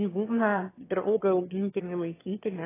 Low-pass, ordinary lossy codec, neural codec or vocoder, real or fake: 3.6 kHz; AAC, 16 kbps; autoencoder, 22.05 kHz, a latent of 192 numbers a frame, VITS, trained on one speaker; fake